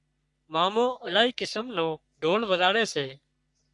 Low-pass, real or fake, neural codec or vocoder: 10.8 kHz; fake; codec, 44.1 kHz, 3.4 kbps, Pupu-Codec